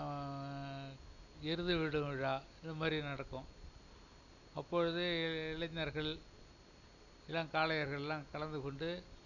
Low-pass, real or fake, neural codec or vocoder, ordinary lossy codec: 7.2 kHz; real; none; none